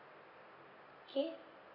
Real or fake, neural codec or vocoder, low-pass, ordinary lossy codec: real; none; 5.4 kHz; none